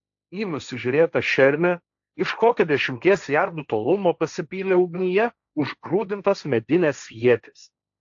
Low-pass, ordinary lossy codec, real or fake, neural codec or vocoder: 7.2 kHz; AAC, 64 kbps; fake; codec, 16 kHz, 1.1 kbps, Voila-Tokenizer